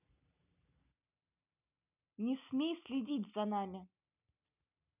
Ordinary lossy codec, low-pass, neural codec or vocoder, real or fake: none; 3.6 kHz; none; real